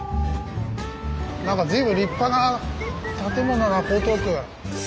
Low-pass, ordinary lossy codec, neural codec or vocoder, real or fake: none; none; none; real